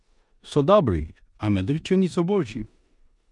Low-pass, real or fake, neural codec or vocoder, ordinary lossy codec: 10.8 kHz; fake; codec, 16 kHz in and 24 kHz out, 0.9 kbps, LongCat-Audio-Codec, four codebook decoder; none